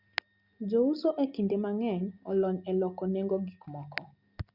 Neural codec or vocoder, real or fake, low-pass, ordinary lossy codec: none; real; 5.4 kHz; Opus, 64 kbps